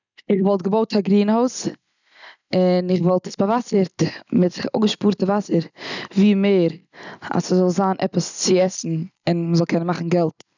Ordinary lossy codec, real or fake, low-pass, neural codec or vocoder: none; real; 7.2 kHz; none